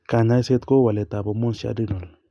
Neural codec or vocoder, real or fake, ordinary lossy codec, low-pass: none; real; none; none